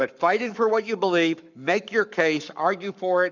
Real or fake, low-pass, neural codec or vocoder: fake; 7.2 kHz; codec, 44.1 kHz, 7.8 kbps, Pupu-Codec